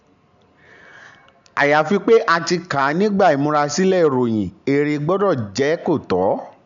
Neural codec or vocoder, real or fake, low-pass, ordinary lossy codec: none; real; 7.2 kHz; none